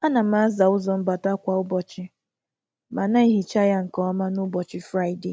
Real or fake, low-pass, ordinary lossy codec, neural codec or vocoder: real; none; none; none